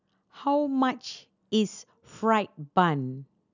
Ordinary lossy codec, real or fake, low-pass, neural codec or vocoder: none; real; 7.2 kHz; none